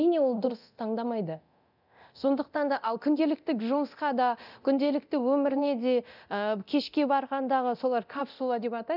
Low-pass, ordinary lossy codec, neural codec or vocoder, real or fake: 5.4 kHz; none; codec, 24 kHz, 0.9 kbps, DualCodec; fake